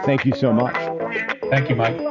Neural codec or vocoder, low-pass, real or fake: none; 7.2 kHz; real